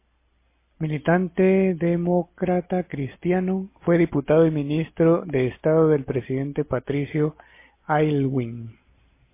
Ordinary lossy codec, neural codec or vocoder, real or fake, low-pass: MP3, 24 kbps; none; real; 3.6 kHz